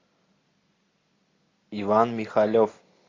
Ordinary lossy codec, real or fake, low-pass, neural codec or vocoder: AAC, 32 kbps; real; 7.2 kHz; none